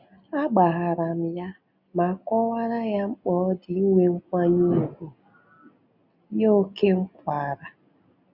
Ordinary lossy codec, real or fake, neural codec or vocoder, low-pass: none; real; none; 5.4 kHz